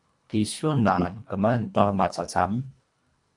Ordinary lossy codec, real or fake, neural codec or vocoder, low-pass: AAC, 64 kbps; fake; codec, 24 kHz, 1.5 kbps, HILCodec; 10.8 kHz